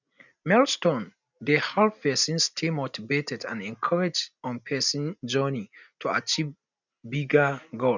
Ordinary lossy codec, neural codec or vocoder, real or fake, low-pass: none; none; real; 7.2 kHz